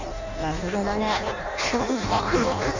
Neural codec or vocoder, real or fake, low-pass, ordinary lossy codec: codec, 16 kHz in and 24 kHz out, 0.6 kbps, FireRedTTS-2 codec; fake; 7.2 kHz; Opus, 64 kbps